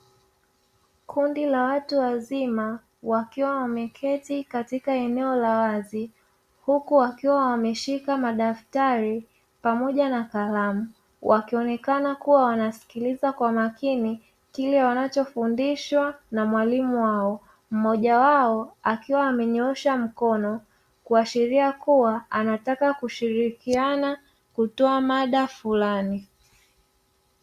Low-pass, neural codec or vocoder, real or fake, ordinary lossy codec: 14.4 kHz; none; real; Opus, 64 kbps